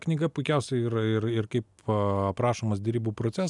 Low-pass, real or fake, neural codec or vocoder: 10.8 kHz; real; none